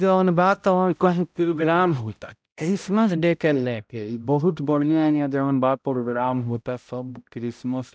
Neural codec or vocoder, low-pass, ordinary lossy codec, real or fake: codec, 16 kHz, 0.5 kbps, X-Codec, HuBERT features, trained on balanced general audio; none; none; fake